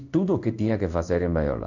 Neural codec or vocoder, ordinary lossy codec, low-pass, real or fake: codec, 16 kHz in and 24 kHz out, 1 kbps, XY-Tokenizer; none; 7.2 kHz; fake